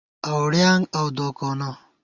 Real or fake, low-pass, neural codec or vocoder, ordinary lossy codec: real; 7.2 kHz; none; Opus, 64 kbps